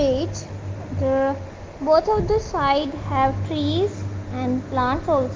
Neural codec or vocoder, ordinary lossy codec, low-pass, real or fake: none; Opus, 32 kbps; 7.2 kHz; real